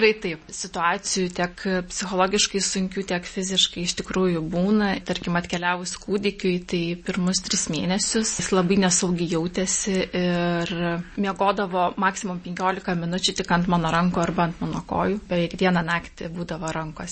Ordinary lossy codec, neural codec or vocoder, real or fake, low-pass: MP3, 32 kbps; none; real; 10.8 kHz